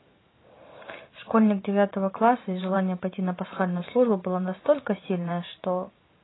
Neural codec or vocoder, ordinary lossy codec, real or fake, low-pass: vocoder, 44.1 kHz, 128 mel bands every 512 samples, BigVGAN v2; AAC, 16 kbps; fake; 7.2 kHz